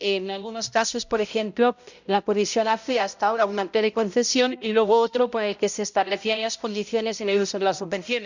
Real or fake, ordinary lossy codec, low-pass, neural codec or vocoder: fake; none; 7.2 kHz; codec, 16 kHz, 0.5 kbps, X-Codec, HuBERT features, trained on balanced general audio